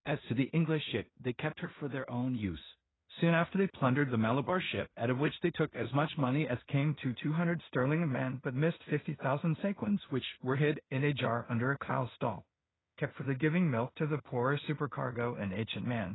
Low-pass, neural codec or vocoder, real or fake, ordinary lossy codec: 7.2 kHz; codec, 16 kHz in and 24 kHz out, 0.4 kbps, LongCat-Audio-Codec, two codebook decoder; fake; AAC, 16 kbps